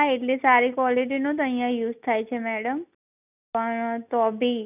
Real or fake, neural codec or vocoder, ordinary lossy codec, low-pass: real; none; none; 3.6 kHz